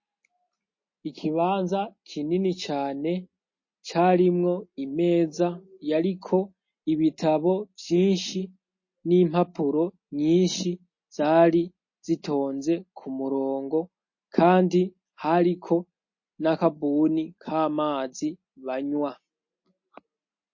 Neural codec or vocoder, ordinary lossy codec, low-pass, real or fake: none; MP3, 32 kbps; 7.2 kHz; real